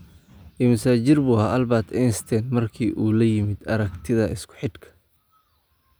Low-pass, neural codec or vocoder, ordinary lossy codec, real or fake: none; none; none; real